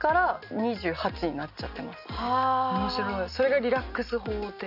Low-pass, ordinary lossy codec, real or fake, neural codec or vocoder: 5.4 kHz; none; real; none